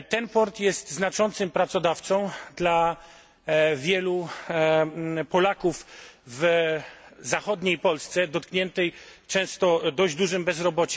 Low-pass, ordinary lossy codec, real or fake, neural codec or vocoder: none; none; real; none